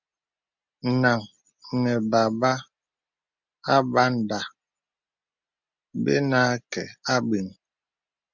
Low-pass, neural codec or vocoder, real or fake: 7.2 kHz; none; real